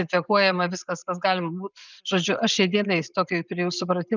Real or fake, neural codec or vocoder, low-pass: real; none; 7.2 kHz